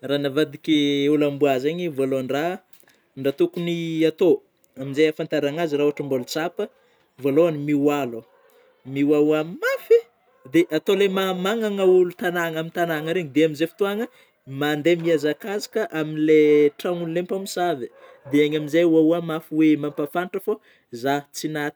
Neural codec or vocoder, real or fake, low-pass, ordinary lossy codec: none; real; none; none